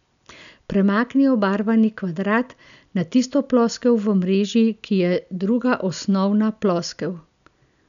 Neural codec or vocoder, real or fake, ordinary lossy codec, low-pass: none; real; none; 7.2 kHz